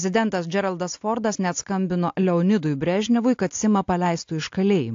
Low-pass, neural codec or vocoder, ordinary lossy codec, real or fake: 7.2 kHz; none; AAC, 48 kbps; real